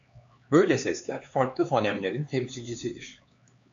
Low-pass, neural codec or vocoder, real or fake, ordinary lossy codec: 7.2 kHz; codec, 16 kHz, 4 kbps, X-Codec, HuBERT features, trained on LibriSpeech; fake; AAC, 64 kbps